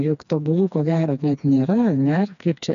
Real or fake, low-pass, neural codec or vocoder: fake; 7.2 kHz; codec, 16 kHz, 2 kbps, FreqCodec, smaller model